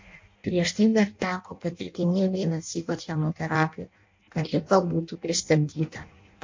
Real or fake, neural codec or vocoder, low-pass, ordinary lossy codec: fake; codec, 16 kHz in and 24 kHz out, 0.6 kbps, FireRedTTS-2 codec; 7.2 kHz; MP3, 48 kbps